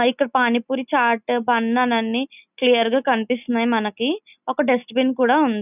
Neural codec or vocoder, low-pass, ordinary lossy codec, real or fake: none; 3.6 kHz; none; real